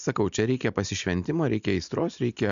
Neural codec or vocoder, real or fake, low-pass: none; real; 7.2 kHz